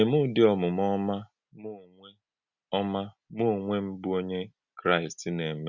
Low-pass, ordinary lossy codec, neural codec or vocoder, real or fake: 7.2 kHz; none; none; real